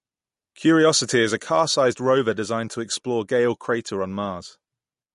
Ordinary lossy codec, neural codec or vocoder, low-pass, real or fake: MP3, 48 kbps; none; 14.4 kHz; real